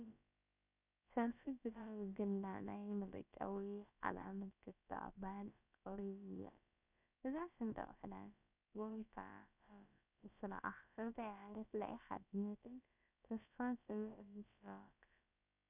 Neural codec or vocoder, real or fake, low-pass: codec, 16 kHz, about 1 kbps, DyCAST, with the encoder's durations; fake; 3.6 kHz